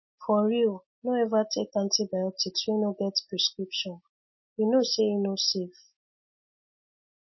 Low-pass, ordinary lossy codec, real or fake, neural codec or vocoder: 7.2 kHz; MP3, 24 kbps; real; none